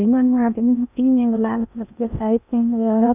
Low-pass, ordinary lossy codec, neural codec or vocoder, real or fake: 3.6 kHz; none; codec, 16 kHz in and 24 kHz out, 0.6 kbps, FocalCodec, streaming, 4096 codes; fake